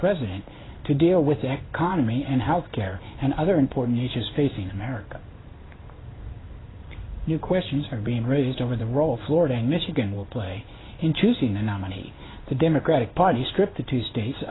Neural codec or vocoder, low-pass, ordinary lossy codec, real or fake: codec, 16 kHz in and 24 kHz out, 1 kbps, XY-Tokenizer; 7.2 kHz; AAC, 16 kbps; fake